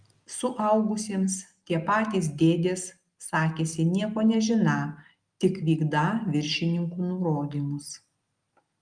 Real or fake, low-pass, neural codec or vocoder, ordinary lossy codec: real; 9.9 kHz; none; Opus, 32 kbps